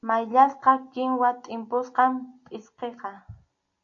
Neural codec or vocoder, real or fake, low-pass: none; real; 7.2 kHz